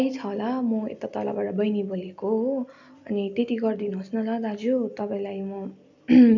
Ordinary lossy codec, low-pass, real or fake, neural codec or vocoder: none; 7.2 kHz; real; none